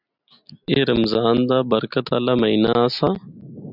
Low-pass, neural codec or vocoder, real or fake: 5.4 kHz; none; real